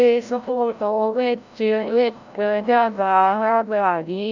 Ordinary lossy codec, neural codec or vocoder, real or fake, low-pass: none; codec, 16 kHz, 0.5 kbps, FreqCodec, larger model; fake; 7.2 kHz